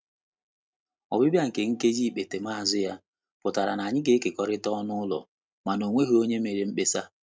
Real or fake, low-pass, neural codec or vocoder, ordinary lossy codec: real; none; none; none